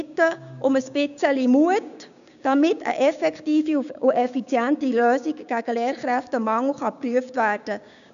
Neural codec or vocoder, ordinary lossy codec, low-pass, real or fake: codec, 16 kHz, 6 kbps, DAC; none; 7.2 kHz; fake